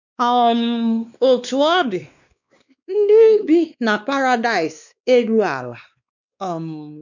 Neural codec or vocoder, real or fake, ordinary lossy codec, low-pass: codec, 16 kHz, 2 kbps, X-Codec, WavLM features, trained on Multilingual LibriSpeech; fake; none; 7.2 kHz